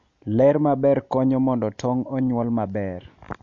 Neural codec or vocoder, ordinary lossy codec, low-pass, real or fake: none; MP3, 48 kbps; 7.2 kHz; real